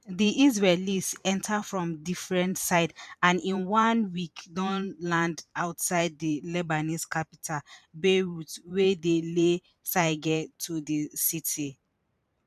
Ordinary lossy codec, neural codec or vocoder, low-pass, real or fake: none; vocoder, 44.1 kHz, 128 mel bands every 512 samples, BigVGAN v2; 14.4 kHz; fake